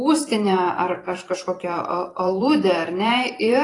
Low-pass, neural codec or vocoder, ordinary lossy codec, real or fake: 10.8 kHz; vocoder, 44.1 kHz, 128 mel bands every 512 samples, BigVGAN v2; AAC, 32 kbps; fake